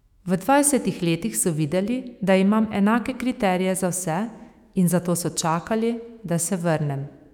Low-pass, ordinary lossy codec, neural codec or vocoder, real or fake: 19.8 kHz; none; autoencoder, 48 kHz, 128 numbers a frame, DAC-VAE, trained on Japanese speech; fake